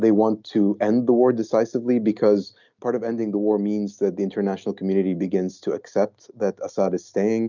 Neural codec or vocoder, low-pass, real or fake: none; 7.2 kHz; real